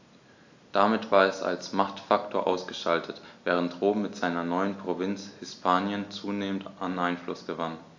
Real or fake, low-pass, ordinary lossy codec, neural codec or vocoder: real; 7.2 kHz; AAC, 48 kbps; none